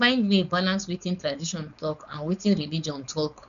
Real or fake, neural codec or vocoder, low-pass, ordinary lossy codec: fake; codec, 16 kHz, 4.8 kbps, FACodec; 7.2 kHz; none